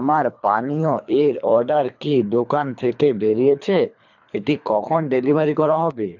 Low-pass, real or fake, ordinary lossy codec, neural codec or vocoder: 7.2 kHz; fake; none; codec, 24 kHz, 3 kbps, HILCodec